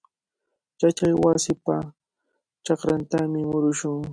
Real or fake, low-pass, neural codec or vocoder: real; 9.9 kHz; none